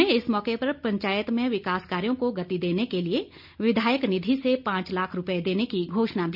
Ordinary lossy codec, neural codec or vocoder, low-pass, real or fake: none; none; 5.4 kHz; real